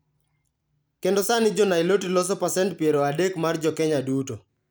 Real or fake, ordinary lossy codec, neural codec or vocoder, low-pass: real; none; none; none